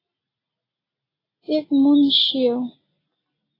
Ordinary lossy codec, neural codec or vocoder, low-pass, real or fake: AAC, 24 kbps; none; 5.4 kHz; real